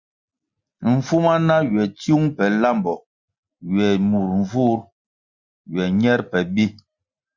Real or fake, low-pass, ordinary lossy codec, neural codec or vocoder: real; 7.2 kHz; Opus, 64 kbps; none